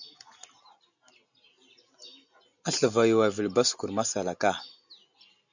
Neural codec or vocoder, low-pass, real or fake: none; 7.2 kHz; real